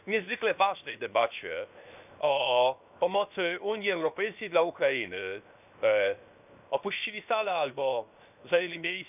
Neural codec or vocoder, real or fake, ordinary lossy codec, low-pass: codec, 16 kHz, 0.7 kbps, FocalCodec; fake; none; 3.6 kHz